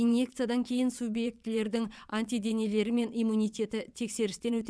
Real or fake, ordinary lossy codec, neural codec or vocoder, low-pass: fake; none; vocoder, 22.05 kHz, 80 mel bands, WaveNeXt; none